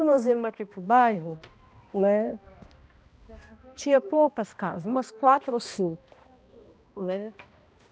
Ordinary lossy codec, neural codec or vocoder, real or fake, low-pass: none; codec, 16 kHz, 1 kbps, X-Codec, HuBERT features, trained on balanced general audio; fake; none